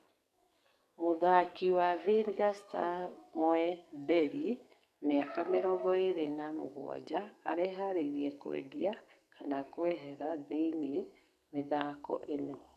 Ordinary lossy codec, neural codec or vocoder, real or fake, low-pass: none; codec, 44.1 kHz, 2.6 kbps, SNAC; fake; 14.4 kHz